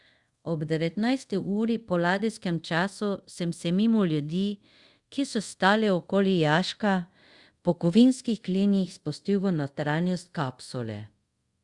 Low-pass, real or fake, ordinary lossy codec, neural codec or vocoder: 10.8 kHz; fake; Opus, 64 kbps; codec, 24 kHz, 0.5 kbps, DualCodec